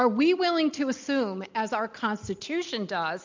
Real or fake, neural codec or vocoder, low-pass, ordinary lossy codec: real; none; 7.2 kHz; MP3, 64 kbps